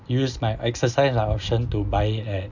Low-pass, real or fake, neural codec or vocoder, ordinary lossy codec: 7.2 kHz; real; none; none